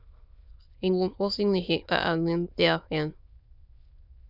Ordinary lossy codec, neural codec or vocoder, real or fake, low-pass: Opus, 64 kbps; autoencoder, 22.05 kHz, a latent of 192 numbers a frame, VITS, trained on many speakers; fake; 5.4 kHz